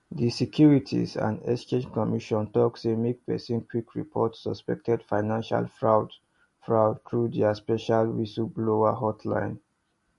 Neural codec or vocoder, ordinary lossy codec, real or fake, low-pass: none; MP3, 48 kbps; real; 14.4 kHz